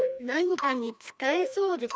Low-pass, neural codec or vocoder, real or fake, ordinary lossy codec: none; codec, 16 kHz, 1 kbps, FreqCodec, larger model; fake; none